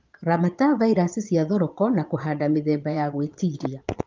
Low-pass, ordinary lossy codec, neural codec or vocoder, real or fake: 7.2 kHz; Opus, 24 kbps; vocoder, 22.05 kHz, 80 mel bands, Vocos; fake